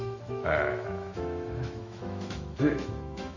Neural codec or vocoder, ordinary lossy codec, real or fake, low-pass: autoencoder, 48 kHz, 128 numbers a frame, DAC-VAE, trained on Japanese speech; none; fake; 7.2 kHz